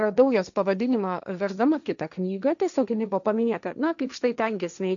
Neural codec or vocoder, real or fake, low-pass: codec, 16 kHz, 1.1 kbps, Voila-Tokenizer; fake; 7.2 kHz